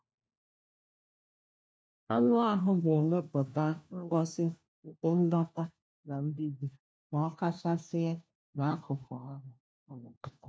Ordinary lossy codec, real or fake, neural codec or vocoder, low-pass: none; fake; codec, 16 kHz, 1 kbps, FunCodec, trained on LibriTTS, 50 frames a second; none